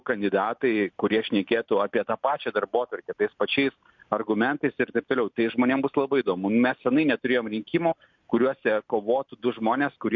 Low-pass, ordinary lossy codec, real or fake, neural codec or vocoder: 7.2 kHz; MP3, 48 kbps; real; none